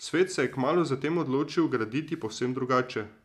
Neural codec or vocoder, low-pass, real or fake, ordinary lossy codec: none; 14.4 kHz; real; none